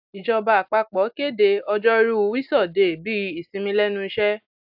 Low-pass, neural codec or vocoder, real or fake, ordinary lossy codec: 5.4 kHz; none; real; AAC, 48 kbps